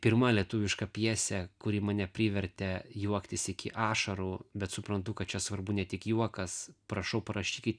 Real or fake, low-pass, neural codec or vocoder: real; 9.9 kHz; none